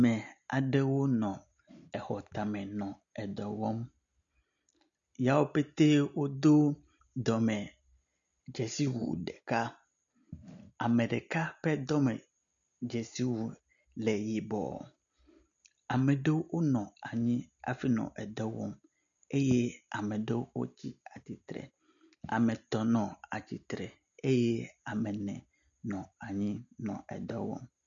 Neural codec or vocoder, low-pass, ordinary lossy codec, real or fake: none; 7.2 kHz; MP3, 96 kbps; real